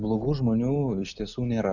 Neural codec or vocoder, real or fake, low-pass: none; real; 7.2 kHz